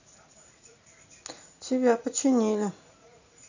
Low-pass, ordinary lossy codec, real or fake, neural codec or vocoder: 7.2 kHz; none; fake; vocoder, 44.1 kHz, 80 mel bands, Vocos